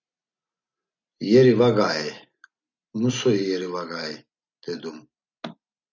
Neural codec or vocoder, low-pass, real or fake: none; 7.2 kHz; real